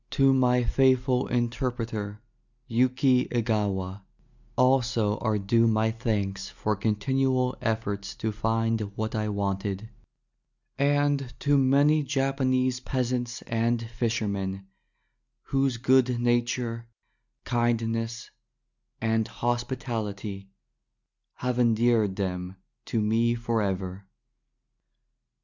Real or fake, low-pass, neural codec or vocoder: real; 7.2 kHz; none